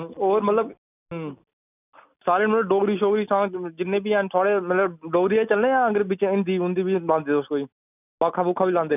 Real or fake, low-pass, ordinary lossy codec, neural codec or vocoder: real; 3.6 kHz; none; none